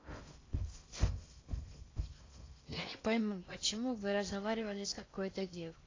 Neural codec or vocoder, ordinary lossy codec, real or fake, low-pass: codec, 16 kHz in and 24 kHz out, 0.6 kbps, FocalCodec, streaming, 4096 codes; AAC, 32 kbps; fake; 7.2 kHz